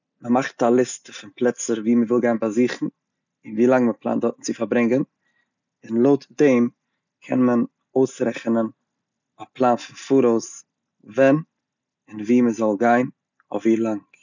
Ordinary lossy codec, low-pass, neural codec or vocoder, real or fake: none; 7.2 kHz; none; real